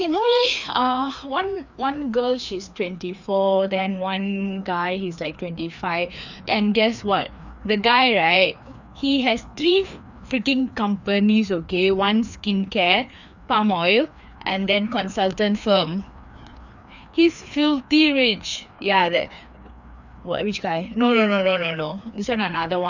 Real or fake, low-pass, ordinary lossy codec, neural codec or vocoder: fake; 7.2 kHz; none; codec, 16 kHz, 2 kbps, FreqCodec, larger model